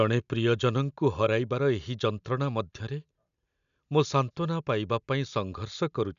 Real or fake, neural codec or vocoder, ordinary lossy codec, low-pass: real; none; none; 7.2 kHz